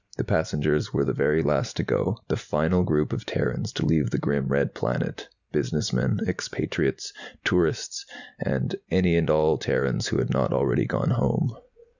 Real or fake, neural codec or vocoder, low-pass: real; none; 7.2 kHz